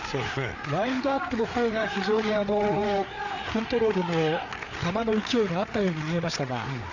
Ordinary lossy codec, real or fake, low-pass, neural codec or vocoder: none; fake; 7.2 kHz; codec, 16 kHz, 4 kbps, FreqCodec, larger model